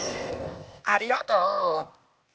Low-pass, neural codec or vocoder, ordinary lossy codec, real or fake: none; codec, 16 kHz, 0.8 kbps, ZipCodec; none; fake